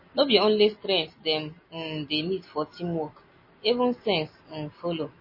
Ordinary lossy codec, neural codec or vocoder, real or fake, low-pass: MP3, 24 kbps; none; real; 5.4 kHz